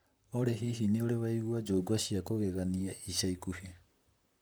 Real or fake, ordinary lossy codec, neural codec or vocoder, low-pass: fake; none; vocoder, 44.1 kHz, 128 mel bands, Pupu-Vocoder; none